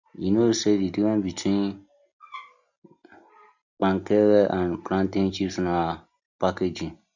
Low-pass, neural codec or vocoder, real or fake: 7.2 kHz; none; real